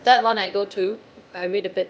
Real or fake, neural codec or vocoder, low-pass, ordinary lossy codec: fake; codec, 16 kHz, 0.8 kbps, ZipCodec; none; none